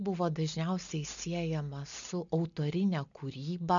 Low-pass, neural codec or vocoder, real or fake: 7.2 kHz; none; real